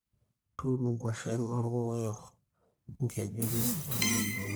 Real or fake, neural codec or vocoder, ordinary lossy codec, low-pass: fake; codec, 44.1 kHz, 1.7 kbps, Pupu-Codec; none; none